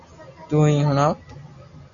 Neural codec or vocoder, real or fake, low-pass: none; real; 7.2 kHz